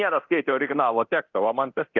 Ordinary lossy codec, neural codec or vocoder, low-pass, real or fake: Opus, 32 kbps; codec, 24 kHz, 0.9 kbps, DualCodec; 7.2 kHz; fake